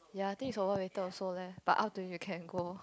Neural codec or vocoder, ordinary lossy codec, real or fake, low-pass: none; none; real; none